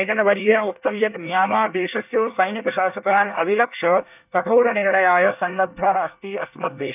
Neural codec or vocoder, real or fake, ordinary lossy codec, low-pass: codec, 24 kHz, 1 kbps, SNAC; fake; none; 3.6 kHz